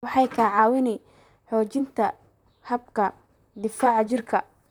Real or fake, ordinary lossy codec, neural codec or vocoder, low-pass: fake; none; vocoder, 44.1 kHz, 128 mel bands, Pupu-Vocoder; 19.8 kHz